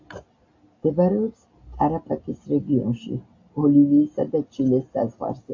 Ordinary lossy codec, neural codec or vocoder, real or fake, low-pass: AAC, 48 kbps; none; real; 7.2 kHz